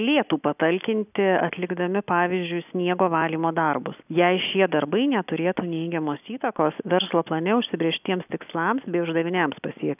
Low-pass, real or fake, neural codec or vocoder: 3.6 kHz; real; none